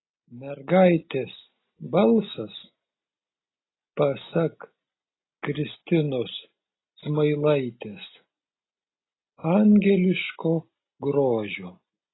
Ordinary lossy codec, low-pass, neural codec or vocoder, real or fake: AAC, 16 kbps; 7.2 kHz; none; real